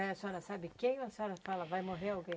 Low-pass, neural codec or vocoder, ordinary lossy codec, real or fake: none; none; none; real